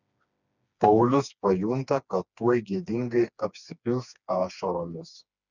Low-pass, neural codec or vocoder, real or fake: 7.2 kHz; codec, 16 kHz, 2 kbps, FreqCodec, smaller model; fake